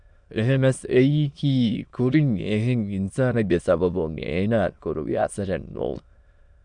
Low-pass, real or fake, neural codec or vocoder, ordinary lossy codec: 9.9 kHz; fake; autoencoder, 22.05 kHz, a latent of 192 numbers a frame, VITS, trained on many speakers; MP3, 96 kbps